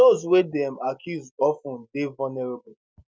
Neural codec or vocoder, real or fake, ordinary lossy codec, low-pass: none; real; none; none